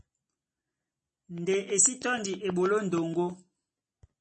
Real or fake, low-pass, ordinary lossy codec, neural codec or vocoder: real; 10.8 kHz; MP3, 32 kbps; none